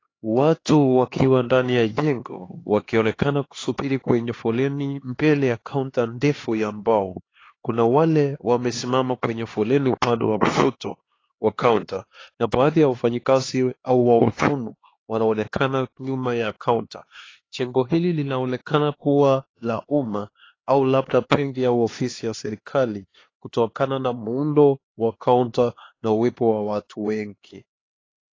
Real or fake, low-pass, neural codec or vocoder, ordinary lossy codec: fake; 7.2 kHz; codec, 16 kHz, 2 kbps, X-Codec, HuBERT features, trained on LibriSpeech; AAC, 32 kbps